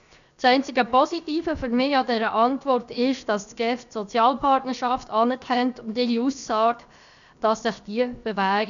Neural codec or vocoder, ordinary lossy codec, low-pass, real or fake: codec, 16 kHz, 0.7 kbps, FocalCodec; none; 7.2 kHz; fake